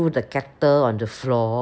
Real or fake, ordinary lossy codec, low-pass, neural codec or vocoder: real; none; none; none